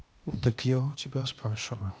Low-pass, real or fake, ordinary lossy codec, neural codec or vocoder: none; fake; none; codec, 16 kHz, 0.8 kbps, ZipCodec